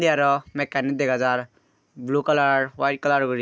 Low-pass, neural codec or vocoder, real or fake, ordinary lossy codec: none; none; real; none